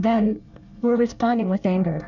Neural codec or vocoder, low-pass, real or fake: codec, 24 kHz, 1 kbps, SNAC; 7.2 kHz; fake